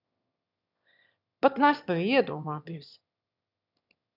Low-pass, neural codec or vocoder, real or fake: 5.4 kHz; autoencoder, 22.05 kHz, a latent of 192 numbers a frame, VITS, trained on one speaker; fake